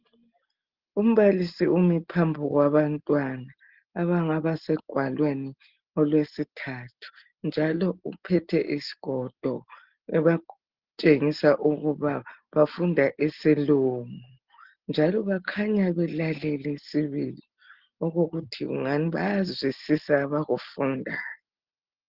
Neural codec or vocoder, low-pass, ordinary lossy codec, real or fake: none; 5.4 kHz; Opus, 16 kbps; real